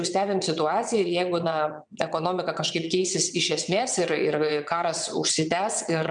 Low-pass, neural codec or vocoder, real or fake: 9.9 kHz; vocoder, 22.05 kHz, 80 mel bands, WaveNeXt; fake